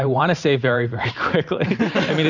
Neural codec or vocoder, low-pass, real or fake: vocoder, 44.1 kHz, 128 mel bands every 512 samples, BigVGAN v2; 7.2 kHz; fake